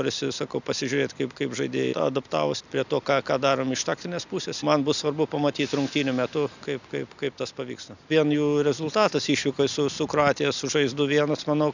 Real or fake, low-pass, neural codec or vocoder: real; 7.2 kHz; none